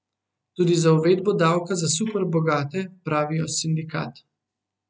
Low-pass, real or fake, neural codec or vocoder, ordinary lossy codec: none; real; none; none